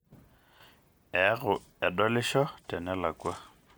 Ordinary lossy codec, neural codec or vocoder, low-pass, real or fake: none; none; none; real